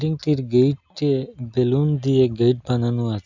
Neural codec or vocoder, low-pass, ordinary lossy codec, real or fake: none; 7.2 kHz; none; real